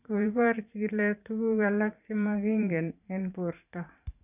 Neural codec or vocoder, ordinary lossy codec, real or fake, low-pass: vocoder, 44.1 kHz, 128 mel bands every 512 samples, BigVGAN v2; none; fake; 3.6 kHz